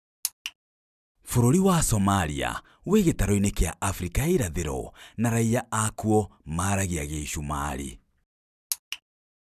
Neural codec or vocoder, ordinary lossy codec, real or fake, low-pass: none; none; real; 14.4 kHz